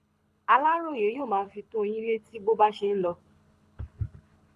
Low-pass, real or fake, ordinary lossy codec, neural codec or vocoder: none; fake; none; codec, 24 kHz, 6 kbps, HILCodec